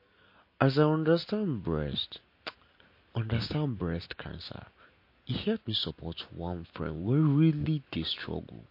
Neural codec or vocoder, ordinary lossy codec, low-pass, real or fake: none; MP3, 32 kbps; 5.4 kHz; real